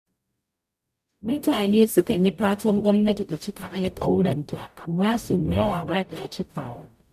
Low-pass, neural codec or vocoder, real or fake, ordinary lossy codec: 14.4 kHz; codec, 44.1 kHz, 0.9 kbps, DAC; fake; none